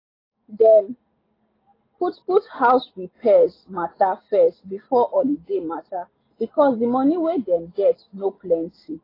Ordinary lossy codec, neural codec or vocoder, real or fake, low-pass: AAC, 24 kbps; none; real; 5.4 kHz